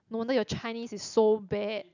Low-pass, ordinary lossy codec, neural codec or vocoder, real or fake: 7.2 kHz; none; none; real